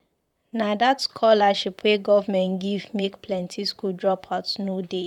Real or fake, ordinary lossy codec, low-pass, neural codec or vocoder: fake; none; 19.8 kHz; vocoder, 44.1 kHz, 128 mel bands, Pupu-Vocoder